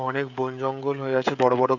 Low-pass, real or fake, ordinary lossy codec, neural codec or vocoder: 7.2 kHz; fake; none; codec, 16 kHz, 16 kbps, FreqCodec, smaller model